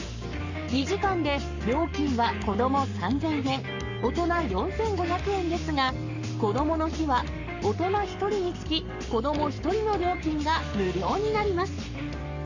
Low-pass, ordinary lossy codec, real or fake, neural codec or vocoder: 7.2 kHz; none; fake; codec, 44.1 kHz, 7.8 kbps, Pupu-Codec